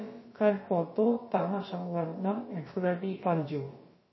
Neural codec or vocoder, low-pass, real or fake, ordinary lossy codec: codec, 16 kHz, about 1 kbps, DyCAST, with the encoder's durations; 7.2 kHz; fake; MP3, 24 kbps